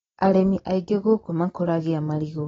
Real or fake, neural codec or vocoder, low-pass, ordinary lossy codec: real; none; 7.2 kHz; AAC, 24 kbps